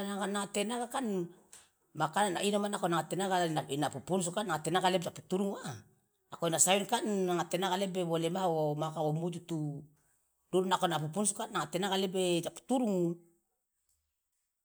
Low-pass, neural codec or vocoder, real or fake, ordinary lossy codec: none; none; real; none